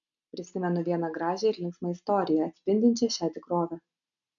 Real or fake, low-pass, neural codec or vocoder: real; 7.2 kHz; none